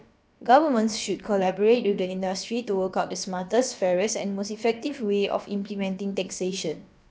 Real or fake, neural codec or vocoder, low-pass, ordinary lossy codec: fake; codec, 16 kHz, about 1 kbps, DyCAST, with the encoder's durations; none; none